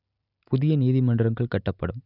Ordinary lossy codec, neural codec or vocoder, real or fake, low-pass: none; none; real; 5.4 kHz